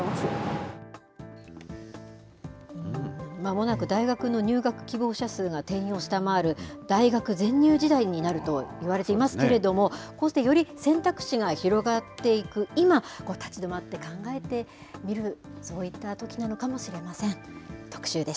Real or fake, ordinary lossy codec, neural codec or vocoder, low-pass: real; none; none; none